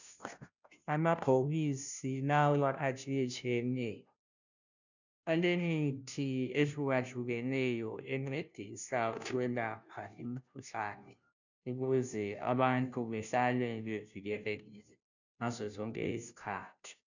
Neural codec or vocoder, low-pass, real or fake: codec, 16 kHz, 0.5 kbps, FunCodec, trained on Chinese and English, 25 frames a second; 7.2 kHz; fake